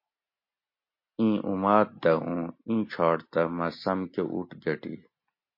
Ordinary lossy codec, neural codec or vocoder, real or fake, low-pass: MP3, 32 kbps; none; real; 5.4 kHz